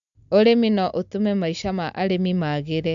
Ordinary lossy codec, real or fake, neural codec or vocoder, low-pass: none; real; none; 7.2 kHz